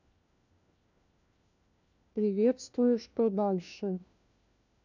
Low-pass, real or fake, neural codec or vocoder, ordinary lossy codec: 7.2 kHz; fake; codec, 16 kHz, 1 kbps, FunCodec, trained on LibriTTS, 50 frames a second; none